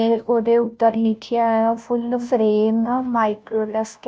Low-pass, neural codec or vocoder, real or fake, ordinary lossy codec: none; codec, 16 kHz, 0.5 kbps, FunCodec, trained on Chinese and English, 25 frames a second; fake; none